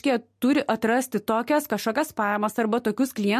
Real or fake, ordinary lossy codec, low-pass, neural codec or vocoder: real; MP3, 64 kbps; 14.4 kHz; none